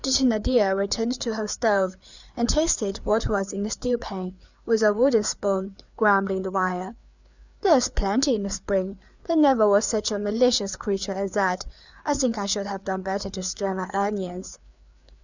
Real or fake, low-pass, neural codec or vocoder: fake; 7.2 kHz; codec, 16 kHz, 4 kbps, FreqCodec, larger model